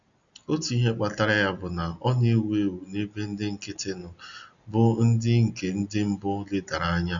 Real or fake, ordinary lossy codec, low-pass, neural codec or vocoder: real; none; 7.2 kHz; none